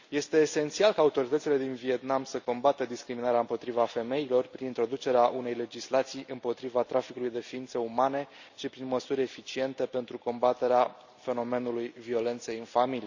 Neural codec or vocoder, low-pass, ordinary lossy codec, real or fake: none; 7.2 kHz; Opus, 64 kbps; real